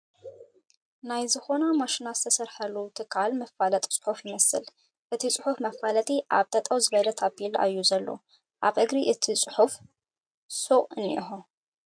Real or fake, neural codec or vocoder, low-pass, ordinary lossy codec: real; none; 9.9 kHz; MP3, 64 kbps